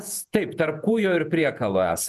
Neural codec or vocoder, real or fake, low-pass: vocoder, 48 kHz, 128 mel bands, Vocos; fake; 14.4 kHz